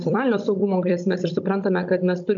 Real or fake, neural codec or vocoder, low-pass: fake; codec, 16 kHz, 16 kbps, FunCodec, trained on Chinese and English, 50 frames a second; 7.2 kHz